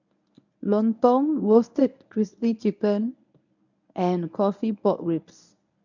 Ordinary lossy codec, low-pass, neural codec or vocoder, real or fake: none; 7.2 kHz; codec, 24 kHz, 0.9 kbps, WavTokenizer, medium speech release version 1; fake